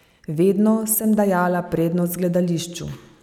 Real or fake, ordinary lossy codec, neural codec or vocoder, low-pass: real; none; none; 19.8 kHz